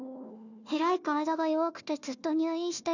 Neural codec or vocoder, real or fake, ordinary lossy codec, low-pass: codec, 16 kHz, 1 kbps, FunCodec, trained on Chinese and English, 50 frames a second; fake; none; 7.2 kHz